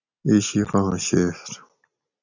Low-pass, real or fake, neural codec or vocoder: 7.2 kHz; real; none